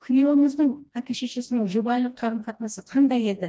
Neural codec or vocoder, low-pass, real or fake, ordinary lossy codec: codec, 16 kHz, 1 kbps, FreqCodec, smaller model; none; fake; none